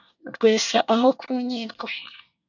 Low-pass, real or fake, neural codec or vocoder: 7.2 kHz; fake; codec, 24 kHz, 1 kbps, SNAC